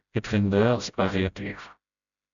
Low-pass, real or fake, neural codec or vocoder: 7.2 kHz; fake; codec, 16 kHz, 0.5 kbps, FreqCodec, smaller model